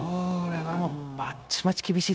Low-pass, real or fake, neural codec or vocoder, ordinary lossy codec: none; fake; codec, 16 kHz, 0.9 kbps, LongCat-Audio-Codec; none